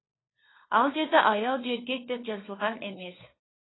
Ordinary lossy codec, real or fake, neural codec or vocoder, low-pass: AAC, 16 kbps; fake; codec, 16 kHz, 4 kbps, FunCodec, trained on LibriTTS, 50 frames a second; 7.2 kHz